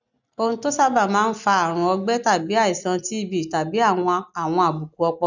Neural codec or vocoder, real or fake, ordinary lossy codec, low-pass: none; real; none; 7.2 kHz